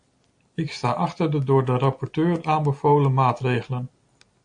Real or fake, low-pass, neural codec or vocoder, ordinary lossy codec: real; 9.9 kHz; none; MP3, 48 kbps